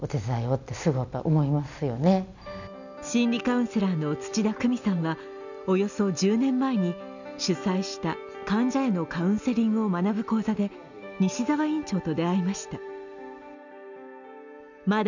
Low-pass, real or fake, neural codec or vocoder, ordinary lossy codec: 7.2 kHz; real; none; none